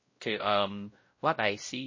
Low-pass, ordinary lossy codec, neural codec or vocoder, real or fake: 7.2 kHz; MP3, 32 kbps; codec, 16 kHz, 0.5 kbps, X-Codec, WavLM features, trained on Multilingual LibriSpeech; fake